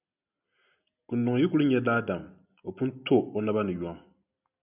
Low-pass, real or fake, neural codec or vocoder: 3.6 kHz; real; none